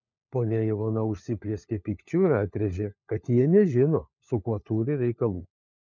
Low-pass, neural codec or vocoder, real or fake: 7.2 kHz; codec, 16 kHz, 4 kbps, FunCodec, trained on LibriTTS, 50 frames a second; fake